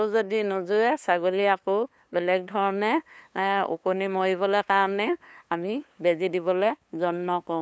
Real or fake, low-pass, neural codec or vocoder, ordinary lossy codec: fake; none; codec, 16 kHz, 2 kbps, FunCodec, trained on LibriTTS, 25 frames a second; none